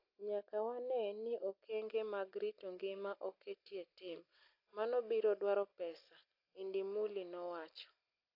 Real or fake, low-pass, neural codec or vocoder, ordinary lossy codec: real; 5.4 kHz; none; AAC, 32 kbps